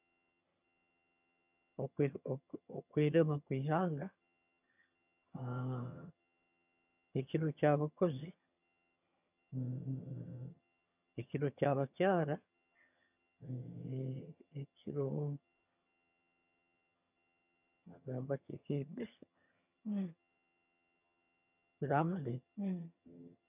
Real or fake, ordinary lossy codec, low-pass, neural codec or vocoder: fake; none; 3.6 kHz; vocoder, 22.05 kHz, 80 mel bands, HiFi-GAN